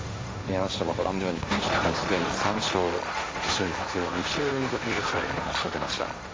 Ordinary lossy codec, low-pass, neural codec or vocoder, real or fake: none; none; codec, 16 kHz, 1.1 kbps, Voila-Tokenizer; fake